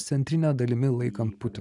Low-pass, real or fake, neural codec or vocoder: 10.8 kHz; real; none